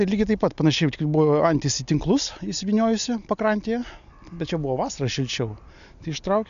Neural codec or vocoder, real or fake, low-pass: none; real; 7.2 kHz